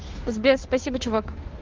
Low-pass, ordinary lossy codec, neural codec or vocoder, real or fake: 7.2 kHz; Opus, 16 kbps; codec, 16 kHz, 2 kbps, FunCodec, trained on Chinese and English, 25 frames a second; fake